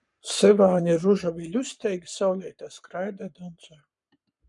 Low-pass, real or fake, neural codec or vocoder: 10.8 kHz; fake; codec, 44.1 kHz, 7.8 kbps, Pupu-Codec